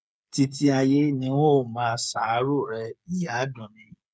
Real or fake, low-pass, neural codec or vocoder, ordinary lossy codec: fake; none; codec, 16 kHz, 8 kbps, FreqCodec, smaller model; none